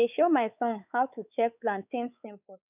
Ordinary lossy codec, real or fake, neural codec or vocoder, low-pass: none; fake; codec, 16 kHz, 4 kbps, X-Codec, WavLM features, trained on Multilingual LibriSpeech; 3.6 kHz